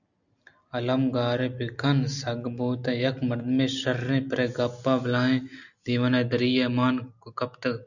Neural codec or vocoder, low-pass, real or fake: none; 7.2 kHz; real